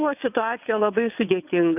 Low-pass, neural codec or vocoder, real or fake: 3.6 kHz; vocoder, 22.05 kHz, 80 mel bands, WaveNeXt; fake